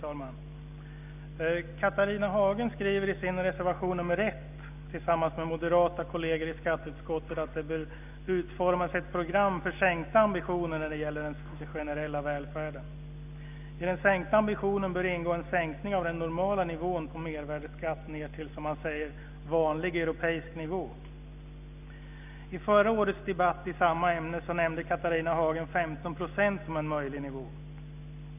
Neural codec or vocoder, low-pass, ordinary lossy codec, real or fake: none; 3.6 kHz; none; real